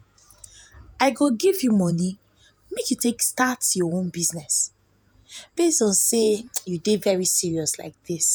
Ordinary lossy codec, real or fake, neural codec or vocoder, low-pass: none; fake; vocoder, 48 kHz, 128 mel bands, Vocos; none